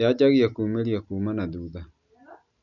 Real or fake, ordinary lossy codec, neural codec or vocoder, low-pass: real; none; none; 7.2 kHz